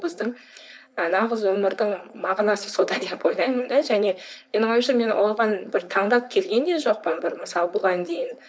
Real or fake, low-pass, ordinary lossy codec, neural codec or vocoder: fake; none; none; codec, 16 kHz, 4.8 kbps, FACodec